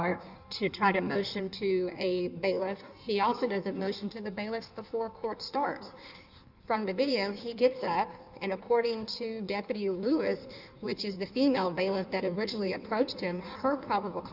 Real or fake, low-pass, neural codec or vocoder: fake; 5.4 kHz; codec, 16 kHz in and 24 kHz out, 1.1 kbps, FireRedTTS-2 codec